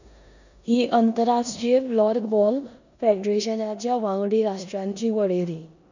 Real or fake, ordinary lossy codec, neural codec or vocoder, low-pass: fake; none; codec, 16 kHz in and 24 kHz out, 0.9 kbps, LongCat-Audio-Codec, four codebook decoder; 7.2 kHz